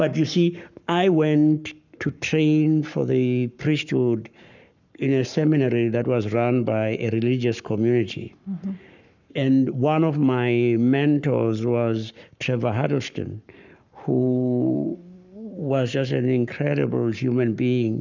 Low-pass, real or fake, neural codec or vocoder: 7.2 kHz; fake; codec, 44.1 kHz, 7.8 kbps, Pupu-Codec